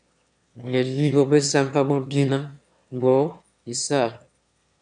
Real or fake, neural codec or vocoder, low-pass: fake; autoencoder, 22.05 kHz, a latent of 192 numbers a frame, VITS, trained on one speaker; 9.9 kHz